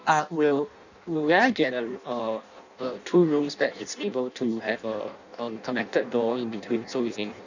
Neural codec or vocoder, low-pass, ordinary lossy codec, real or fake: codec, 16 kHz in and 24 kHz out, 0.6 kbps, FireRedTTS-2 codec; 7.2 kHz; none; fake